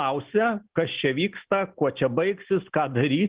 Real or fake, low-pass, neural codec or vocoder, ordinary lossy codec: real; 3.6 kHz; none; Opus, 16 kbps